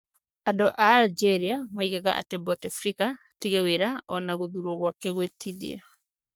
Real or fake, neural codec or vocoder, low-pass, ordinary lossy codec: fake; codec, 44.1 kHz, 3.4 kbps, Pupu-Codec; none; none